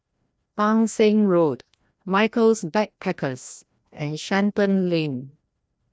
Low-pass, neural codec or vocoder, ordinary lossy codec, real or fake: none; codec, 16 kHz, 1 kbps, FreqCodec, larger model; none; fake